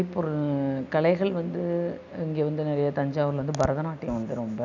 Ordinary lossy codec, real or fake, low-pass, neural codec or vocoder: none; real; 7.2 kHz; none